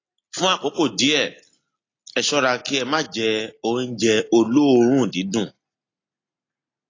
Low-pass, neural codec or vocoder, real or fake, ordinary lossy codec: 7.2 kHz; none; real; AAC, 32 kbps